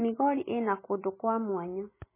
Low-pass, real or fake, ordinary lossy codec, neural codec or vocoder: 3.6 kHz; real; MP3, 16 kbps; none